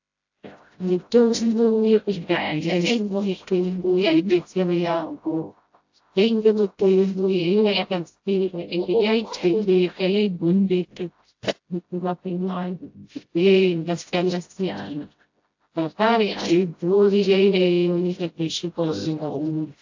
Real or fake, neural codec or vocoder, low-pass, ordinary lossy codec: fake; codec, 16 kHz, 0.5 kbps, FreqCodec, smaller model; 7.2 kHz; AAC, 48 kbps